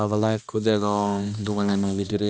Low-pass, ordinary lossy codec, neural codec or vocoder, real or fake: none; none; codec, 16 kHz, 1 kbps, X-Codec, HuBERT features, trained on balanced general audio; fake